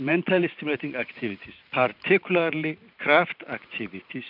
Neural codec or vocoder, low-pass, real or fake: vocoder, 44.1 kHz, 128 mel bands every 512 samples, BigVGAN v2; 5.4 kHz; fake